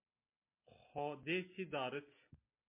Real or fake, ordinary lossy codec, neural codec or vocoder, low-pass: real; MP3, 24 kbps; none; 3.6 kHz